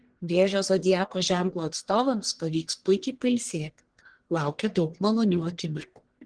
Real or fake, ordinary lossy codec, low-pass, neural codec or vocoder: fake; Opus, 16 kbps; 9.9 kHz; codec, 44.1 kHz, 1.7 kbps, Pupu-Codec